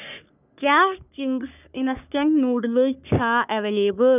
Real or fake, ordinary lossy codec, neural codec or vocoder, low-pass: fake; none; codec, 44.1 kHz, 3.4 kbps, Pupu-Codec; 3.6 kHz